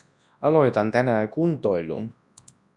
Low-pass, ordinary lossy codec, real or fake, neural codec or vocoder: 10.8 kHz; MP3, 96 kbps; fake; codec, 24 kHz, 0.9 kbps, WavTokenizer, large speech release